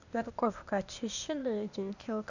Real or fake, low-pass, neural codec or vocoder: fake; 7.2 kHz; codec, 16 kHz, 0.8 kbps, ZipCodec